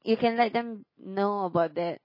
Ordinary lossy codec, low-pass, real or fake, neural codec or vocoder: MP3, 24 kbps; 5.4 kHz; real; none